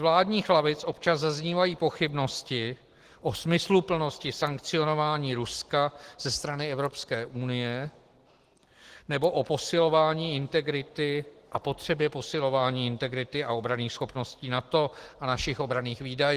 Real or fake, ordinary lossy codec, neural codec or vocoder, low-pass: real; Opus, 16 kbps; none; 14.4 kHz